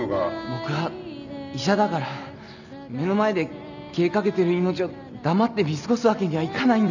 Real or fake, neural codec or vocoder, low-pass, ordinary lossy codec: real; none; 7.2 kHz; none